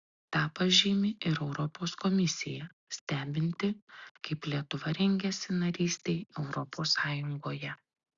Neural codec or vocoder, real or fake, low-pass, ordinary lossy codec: none; real; 7.2 kHz; Opus, 64 kbps